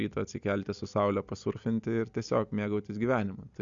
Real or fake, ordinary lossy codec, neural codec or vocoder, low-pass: real; Opus, 64 kbps; none; 7.2 kHz